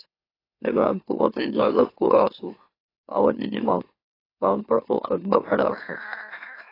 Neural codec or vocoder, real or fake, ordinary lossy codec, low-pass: autoencoder, 44.1 kHz, a latent of 192 numbers a frame, MeloTTS; fake; AAC, 24 kbps; 5.4 kHz